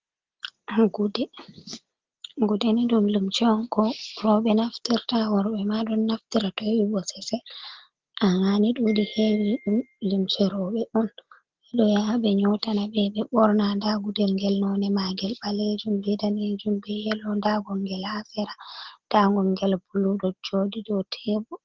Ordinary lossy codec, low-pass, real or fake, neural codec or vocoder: Opus, 16 kbps; 7.2 kHz; real; none